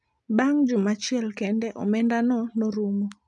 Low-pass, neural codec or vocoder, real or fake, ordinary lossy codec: 10.8 kHz; none; real; none